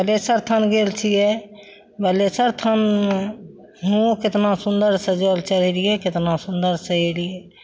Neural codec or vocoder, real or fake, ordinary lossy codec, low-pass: none; real; none; none